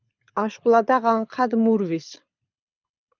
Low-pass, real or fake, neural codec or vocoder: 7.2 kHz; fake; codec, 24 kHz, 6 kbps, HILCodec